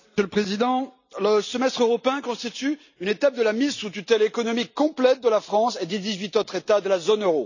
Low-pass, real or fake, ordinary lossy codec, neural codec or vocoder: 7.2 kHz; real; none; none